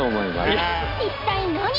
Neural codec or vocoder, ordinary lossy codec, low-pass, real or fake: none; none; 5.4 kHz; real